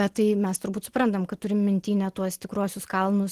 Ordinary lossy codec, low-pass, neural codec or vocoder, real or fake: Opus, 16 kbps; 14.4 kHz; none; real